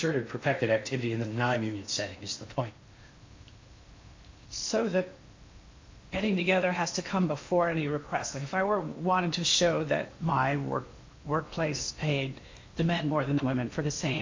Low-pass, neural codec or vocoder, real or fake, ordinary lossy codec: 7.2 kHz; codec, 16 kHz in and 24 kHz out, 0.8 kbps, FocalCodec, streaming, 65536 codes; fake; MP3, 48 kbps